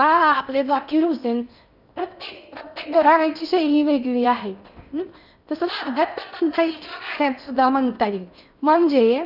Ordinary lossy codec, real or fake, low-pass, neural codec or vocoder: none; fake; 5.4 kHz; codec, 16 kHz in and 24 kHz out, 0.6 kbps, FocalCodec, streaming, 4096 codes